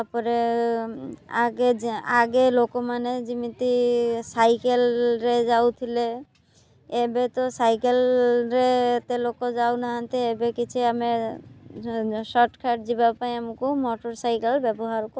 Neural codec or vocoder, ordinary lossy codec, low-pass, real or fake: none; none; none; real